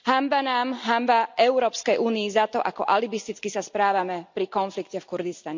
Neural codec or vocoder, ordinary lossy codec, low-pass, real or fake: none; MP3, 64 kbps; 7.2 kHz; real